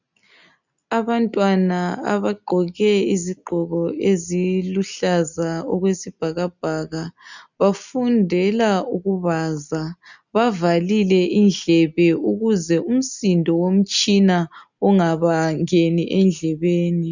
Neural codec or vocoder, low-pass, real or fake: none; 7.2 kHz; real